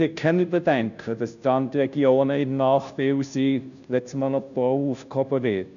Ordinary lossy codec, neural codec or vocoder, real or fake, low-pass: none; codec, 16 kHz, 0.5 kbps, FunCodec, trained on Chinese and English, 25 frames a second; fake; 7.2 kHz